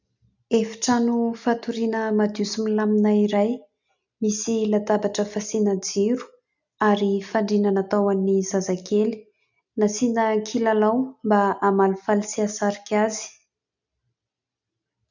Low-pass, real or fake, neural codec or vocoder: 7.2 kHz; real; none